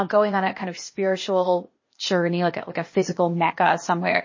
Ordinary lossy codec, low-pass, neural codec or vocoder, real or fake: MP3, 32 kbps; 7.2 kHz; codec, 16 kHz, 0.8 kbps, ZipCodec; fake